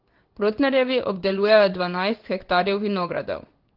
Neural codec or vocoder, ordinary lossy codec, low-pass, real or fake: codec, 16 kHz in and 24 kHz out, 1 kbps, XY-Tokenizer; Opus, 16 kbps; 5.4 kHz; fake